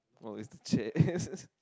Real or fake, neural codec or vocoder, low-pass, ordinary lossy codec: real; none; none; none